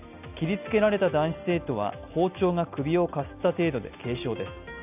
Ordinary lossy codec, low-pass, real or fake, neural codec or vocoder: none; 3.6 kHz; real; none